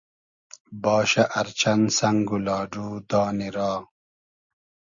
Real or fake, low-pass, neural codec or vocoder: real; 7.2 kHz; none